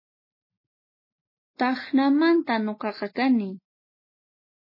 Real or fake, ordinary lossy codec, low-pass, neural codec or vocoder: real; MP3, 24 kbps; 5.4 kHz; none